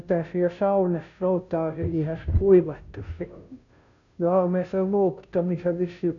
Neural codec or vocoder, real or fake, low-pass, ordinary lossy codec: codec, 16 kHz, 0.5 kbps, FunCodec, trained on Chinese and English, 25 frames a second; fake; 7.2 kHz; none